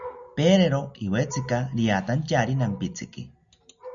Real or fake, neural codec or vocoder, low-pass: real; none; 7.2 kHz